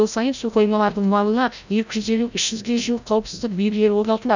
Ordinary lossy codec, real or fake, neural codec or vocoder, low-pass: none; fake; codec, 16 kHz, 0.5 kbps, FreqCodec, larger model; 7.2 kHz